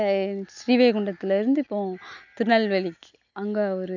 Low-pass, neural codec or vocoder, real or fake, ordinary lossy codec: 7.2 kHz; none; real; none